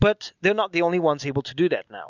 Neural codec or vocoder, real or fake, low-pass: none; real; 7.2 kHz